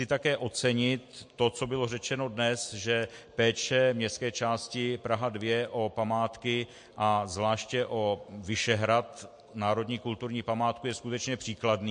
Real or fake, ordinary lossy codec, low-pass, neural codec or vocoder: real; MP3, 48 kbps; 9.9 kHz; none